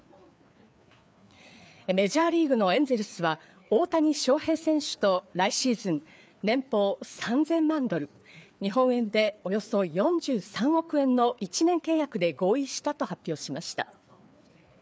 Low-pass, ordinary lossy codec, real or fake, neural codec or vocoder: none; none; fake; codec, 16 kHz, 4 kbps, FreqCodec, larger model